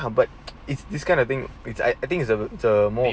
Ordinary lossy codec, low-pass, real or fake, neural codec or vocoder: none; none; real; none